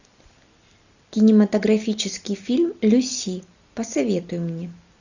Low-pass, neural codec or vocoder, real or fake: 7.2 kHz; none; real